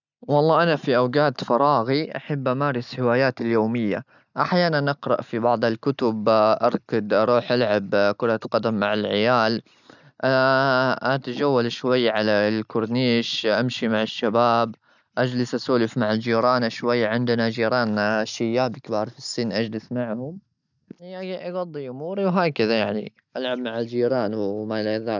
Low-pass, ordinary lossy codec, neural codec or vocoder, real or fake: 7.2 kHz; none; none; real